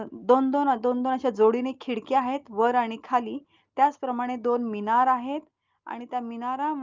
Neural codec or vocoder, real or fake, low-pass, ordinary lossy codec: none; real; 7.2 kHz; Opus, 32 kbps